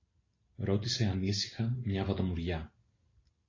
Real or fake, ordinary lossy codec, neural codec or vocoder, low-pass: real; AAC, 32 kbps; none; 7.2 kHz